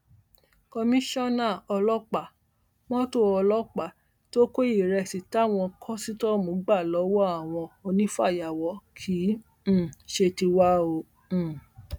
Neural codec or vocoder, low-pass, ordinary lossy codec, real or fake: none; 19.8 kHz; none; real